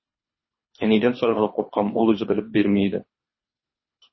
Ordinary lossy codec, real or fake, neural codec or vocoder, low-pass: MP3, 24 kbps; fake; codec, 24 kHz, 3 kbps, HILCodec; 7.2 kHz